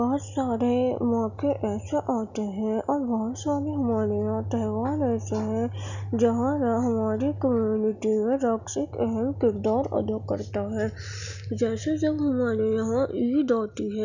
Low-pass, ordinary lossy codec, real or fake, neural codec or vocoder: 7.2 kHz; none; real; none